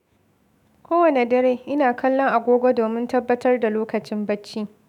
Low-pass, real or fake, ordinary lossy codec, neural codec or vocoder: 19.8 kHz; fake; none; autoencoder, 48 kHz, 128 numbers a frame, DAC-VAE, trained on Japanese speech